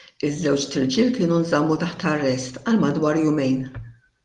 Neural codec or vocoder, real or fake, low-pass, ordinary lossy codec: none; real; 10.8 kHz; Opus, 24 kbps